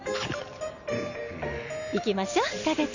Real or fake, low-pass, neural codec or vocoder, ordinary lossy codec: real; 7.2 kHz; none; none